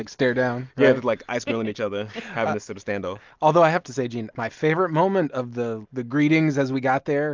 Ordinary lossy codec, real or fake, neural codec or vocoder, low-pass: Opus, 24 kbps; real; none; 7.2 kHz